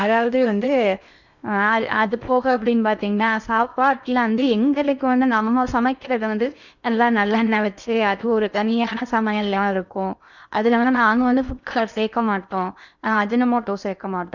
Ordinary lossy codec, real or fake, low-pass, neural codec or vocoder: none; fake; 7.2 kHz; codec, 16 kHz in and 24 kHz out, 0.6 kbps, FocalCodec, streaming, 2048 codes